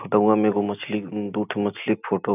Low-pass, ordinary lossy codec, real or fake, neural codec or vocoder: 3.6 kHz; none; real; none